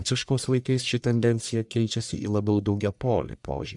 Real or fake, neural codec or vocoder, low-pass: fake; codec, 44.1 kHz, 1.7 kbps, Pupu-Codec; 10.8 kHz